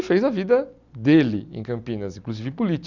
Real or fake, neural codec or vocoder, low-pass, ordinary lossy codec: real; none; 7.2 kHz; none